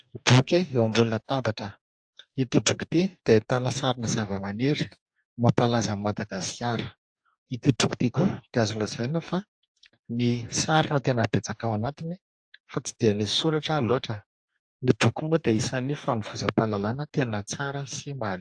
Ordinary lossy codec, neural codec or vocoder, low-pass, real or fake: AAC, 64 kbps; codec, 44.1 kHz, 2.6 kbps, DAC; 9.9 kHz; fake